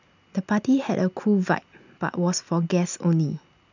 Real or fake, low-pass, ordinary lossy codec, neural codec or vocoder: real; 7.2 kHz; none; none